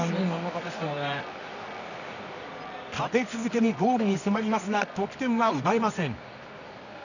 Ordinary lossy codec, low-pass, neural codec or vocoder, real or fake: none; 7.2 kHz; codec, 24 kHz, 0.9 kbps, WavTokenizer, medium music audio release; fake